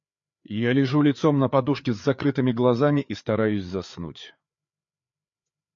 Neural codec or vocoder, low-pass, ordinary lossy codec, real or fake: codec, 16 kHz, 4 kbps, FreqCodec, larger model; 7.2 kHz; MP3, 48 kbps; fake